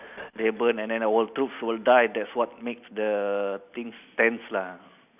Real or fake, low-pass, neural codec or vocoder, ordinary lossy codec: real; 3.6 kHz; none; none